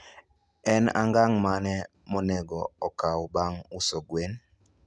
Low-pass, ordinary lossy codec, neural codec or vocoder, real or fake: none; none; none; real